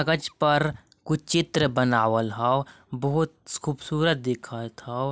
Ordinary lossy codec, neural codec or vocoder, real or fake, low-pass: none; none; real; none